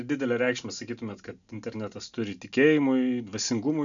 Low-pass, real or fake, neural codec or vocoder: 7.2 kHz; real; none